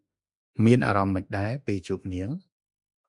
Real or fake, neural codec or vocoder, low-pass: fake; autoencoder, 48 kHz, 32 numbers a frame, DAC-VAE, trained on Japanese speech; 10.8 kHz